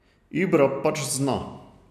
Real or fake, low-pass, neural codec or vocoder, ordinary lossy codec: real; 14.4 kHz; none; none